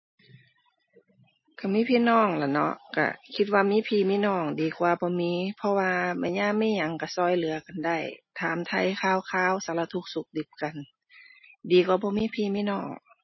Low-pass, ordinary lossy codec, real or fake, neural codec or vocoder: 7.2 kHz; MP3, 24 kbps; real; none